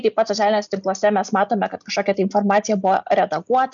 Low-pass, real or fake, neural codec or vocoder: 7.2 kHz; real; none